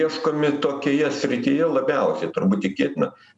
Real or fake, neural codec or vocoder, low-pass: real; none; 10.8 kHz